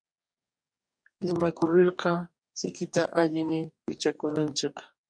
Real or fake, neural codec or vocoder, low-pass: fake; codec, 44.1 kHz, 2.6 kbps, DAC; 9.9 kHz